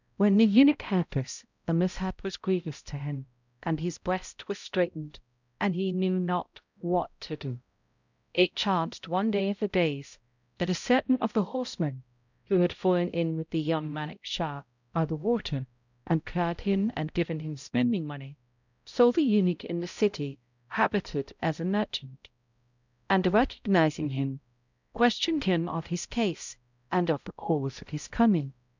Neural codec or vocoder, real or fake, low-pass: codec, 16 kHz, 0.5 kbps, X-Codec, HuBERT features, trained on balanced general audio; fake; 7.2 kHz